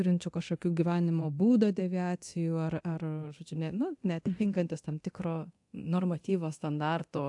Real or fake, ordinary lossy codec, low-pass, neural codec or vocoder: fake; AAC, 64 kbps; 10.8 kHz; codec, 24 kHz, 0.9 kbps, DualCodec